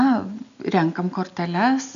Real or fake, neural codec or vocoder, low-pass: real; none; 7.2 kHz